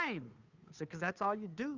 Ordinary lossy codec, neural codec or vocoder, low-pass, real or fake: Opus, 64 kbps; codec, 16 kHz, 2 kbps, FunCodec, trained on Chinese and English, 25 frames a second; 7.2 kHz; fake